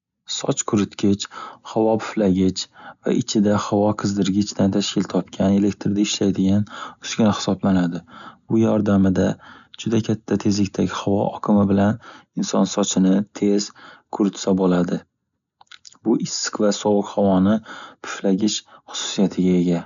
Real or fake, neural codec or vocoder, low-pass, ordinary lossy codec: real; none; 7.2 kHz; none